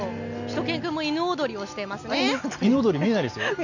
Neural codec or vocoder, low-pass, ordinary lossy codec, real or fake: none; 7.2 kHz; none; real